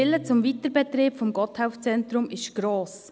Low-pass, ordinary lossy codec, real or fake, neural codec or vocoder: none; none; real; none